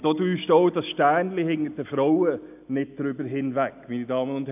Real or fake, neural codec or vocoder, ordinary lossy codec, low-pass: real; none; AAC, 32 kbps; 3.6 kHz